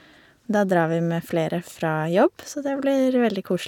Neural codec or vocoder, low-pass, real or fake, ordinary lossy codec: none; 19.8 kHz; real; none